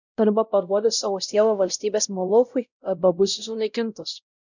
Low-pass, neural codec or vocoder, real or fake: 7.2 kHz; codec, 16 kHz, 0.5 kbps, X-Codec, WavLM features, trained on Multilingual LibriSpeech; fake